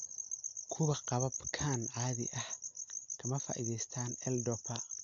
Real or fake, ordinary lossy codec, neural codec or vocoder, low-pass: real; AAC, 64 kbps; none; 7.2 kHz